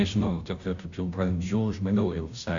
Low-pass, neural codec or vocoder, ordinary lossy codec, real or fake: 7.2 kHz; codec, 16 kHz, 0.5 kbps, FunCodec, trained on Chinese and English, 25 frames a second; MP3, 64 kbps; fake